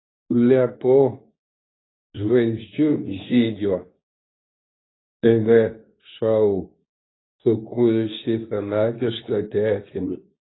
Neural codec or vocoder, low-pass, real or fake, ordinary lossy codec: codec, 24 kHz, 0.9 kbps, WavTokenizer, medium speech release version 2; 7.2 kHz; fake; AAC, 16 kbps